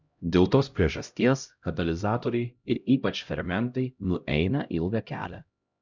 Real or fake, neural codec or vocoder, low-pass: fake; codec, 16 kHz, 0.5 kbps, X-Codec, HuBERT features, trained on LibriSpeech; 7.2 kHz